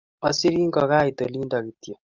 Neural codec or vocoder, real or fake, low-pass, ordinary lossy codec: none; real; 7.2 kHz; Opus, 24 kbps